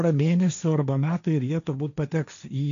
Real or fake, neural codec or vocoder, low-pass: fake; codec, 16 kHz, 1.1 kbps, Voila-Tokenizer; 7.2 kHz